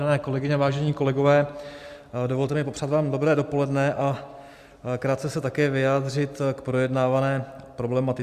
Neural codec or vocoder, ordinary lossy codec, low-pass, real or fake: none; Opus, 64 kbps; 14.4 kHz; real